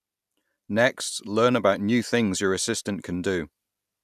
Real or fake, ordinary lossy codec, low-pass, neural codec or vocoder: real; none; 14.4 kHz; none